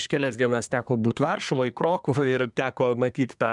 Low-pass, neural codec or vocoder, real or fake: 10.8 kHz; codec, 24 kHz, 1 kbps, SNAC; fake